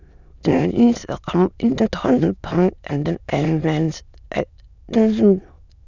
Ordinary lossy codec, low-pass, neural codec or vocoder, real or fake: none; 7.2 kHz; autoencoder, 22.05 kHz, a latent of 192 numbers a frame, VITS, trained on many speakers; fake